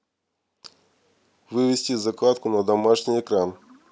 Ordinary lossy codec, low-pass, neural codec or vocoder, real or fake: none; none; none; real